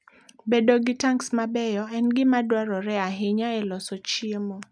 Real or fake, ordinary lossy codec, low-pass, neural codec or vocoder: real; none; none; none